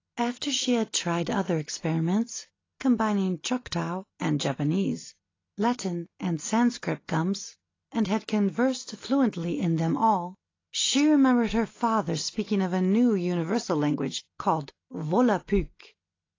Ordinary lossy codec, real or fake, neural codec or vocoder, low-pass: AAC, 32 kbps; real; none; 7.2 kHz